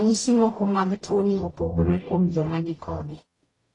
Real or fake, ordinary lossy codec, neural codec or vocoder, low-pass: fake; AAC, 32 kbps; codec, 44.1 kHz, 0.9 kbps, DAC; 10.8 kHz